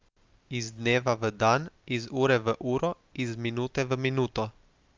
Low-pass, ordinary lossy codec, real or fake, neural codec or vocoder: 7.2 kHz; Opus, 32 kbps; real; none